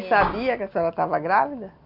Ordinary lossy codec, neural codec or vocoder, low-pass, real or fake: none; none; 5.4 kHz; real